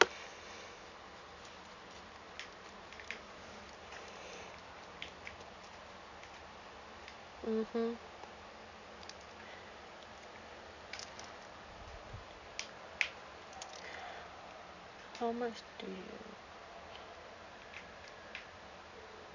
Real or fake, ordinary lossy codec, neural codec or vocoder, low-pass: real; none; none; 7.2 kHz